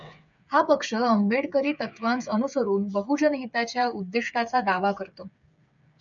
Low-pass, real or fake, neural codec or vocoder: 7.2 kHz; fake; codec, 16 kHz, 8 kbps, FreqCodec, smaller model